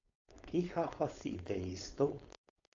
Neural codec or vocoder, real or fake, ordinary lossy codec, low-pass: codec, 16 kHz, 4.8 kbps, FACodec; fake; AAC, 48 kbps; 7.2 kHz